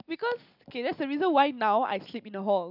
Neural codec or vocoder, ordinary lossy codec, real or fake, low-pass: none; none; real; 5.4 kHz